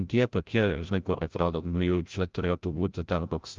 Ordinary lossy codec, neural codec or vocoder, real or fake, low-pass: Opus, 32 kbps; codec, 16 kHz, 0.5 kbps, FreqCodec, larger model; fake; 7.2 kHz